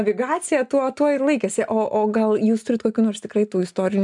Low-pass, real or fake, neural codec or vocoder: 10.8 kHz; real; none